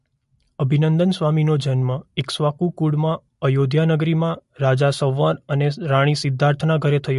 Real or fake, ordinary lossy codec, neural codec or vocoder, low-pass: real; MP3, 48 kbps; none; 14.4 kHz